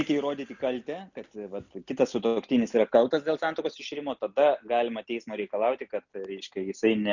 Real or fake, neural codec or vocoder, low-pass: real; none; 7.2 kHz